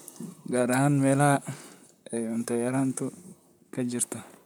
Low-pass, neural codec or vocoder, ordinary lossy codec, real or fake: none; vocoder, 44.1 kHz, 128 mel bands, Pupu-Vocoder; none; fake